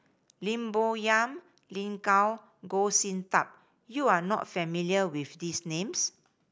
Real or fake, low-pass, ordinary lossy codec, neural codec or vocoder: real; none; none; none